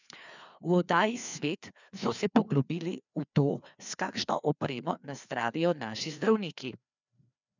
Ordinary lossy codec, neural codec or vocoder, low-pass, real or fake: none; codec, 16 kHz, 2 kbps, FreqCodec, larger model; 7.2 kHz; fake